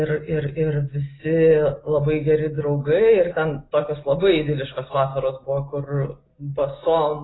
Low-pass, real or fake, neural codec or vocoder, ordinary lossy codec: 7.2 kHz; real; none; AAC, 16 kbps